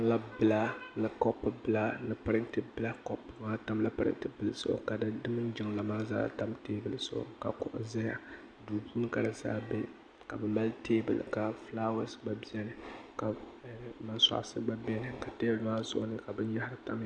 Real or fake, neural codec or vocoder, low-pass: real; none; 9.9 kHz